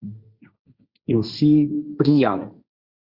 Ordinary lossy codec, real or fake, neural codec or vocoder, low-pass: Opus, 64 kbps; fake; codec, 16 kHz, 1.1 kbps, Voila-Tokenizer; 5.4 kHz